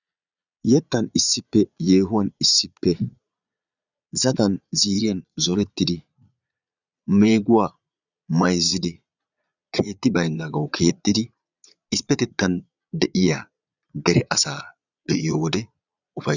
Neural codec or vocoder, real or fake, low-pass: vocoder, 22.05 kHz, 80 mel bands, Vocos; fake; 7.2 kHz